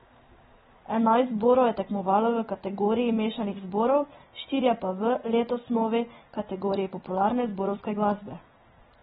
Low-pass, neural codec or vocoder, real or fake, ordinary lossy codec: 9.9 kHz; none; real; AAC, 16 kbps